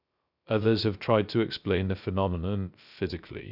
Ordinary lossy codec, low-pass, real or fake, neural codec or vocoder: none; 5.4 kHz; fake; codec, 16 kHz, 0.3 kbps, FocalCodec